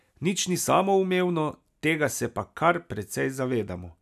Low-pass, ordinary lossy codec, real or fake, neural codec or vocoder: 14.4 kHz; none; real; none